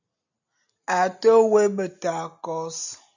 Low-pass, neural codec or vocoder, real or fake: 7.2 kHz; none; real